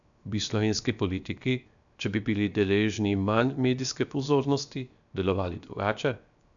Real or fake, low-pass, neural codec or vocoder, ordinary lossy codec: fake; 7.2 kHz; codec, 16 kHz, 0.7 kbps, FocalCodec; none